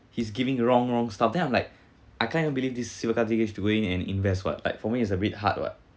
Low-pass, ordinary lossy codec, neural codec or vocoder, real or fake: none; none; none; real